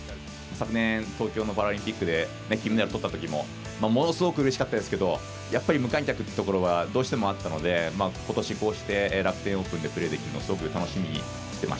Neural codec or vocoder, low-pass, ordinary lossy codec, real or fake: none; none; none; real